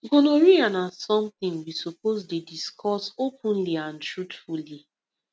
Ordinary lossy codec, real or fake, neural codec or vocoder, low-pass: none; real; none; none